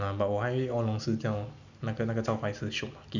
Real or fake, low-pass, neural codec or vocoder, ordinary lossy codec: real; 7.2 kHz; none; none